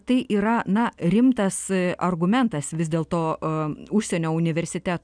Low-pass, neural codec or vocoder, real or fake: 9.9 kHz; none; real